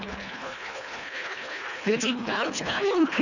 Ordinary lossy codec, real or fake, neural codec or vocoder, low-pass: none; fake; codec, 24 kHz, 1.5 kbps, HILCodec; 7.2 kHz